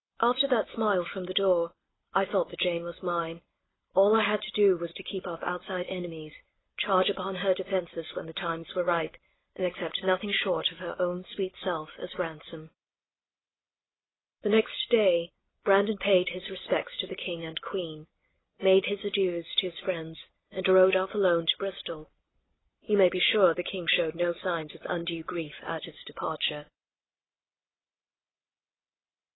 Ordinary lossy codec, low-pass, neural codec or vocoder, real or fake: AAC, 16 kbps; 7.2 kHz; none; real